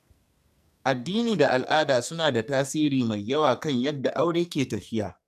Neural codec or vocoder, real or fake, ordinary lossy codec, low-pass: codec, 44.1 kHz, 2.6 kbps, SNAC; fake; none; 14.4 kHz